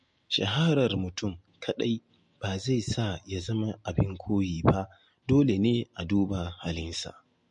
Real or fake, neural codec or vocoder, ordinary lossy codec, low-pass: real; none; MP3, 48 kbps; 10.8 kHz